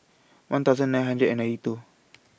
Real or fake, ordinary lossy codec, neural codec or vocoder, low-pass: real; none; none; none